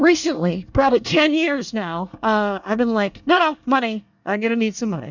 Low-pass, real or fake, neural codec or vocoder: 7.2 kHz; fake; codec, 24 kHz, 1 kbps, SNAC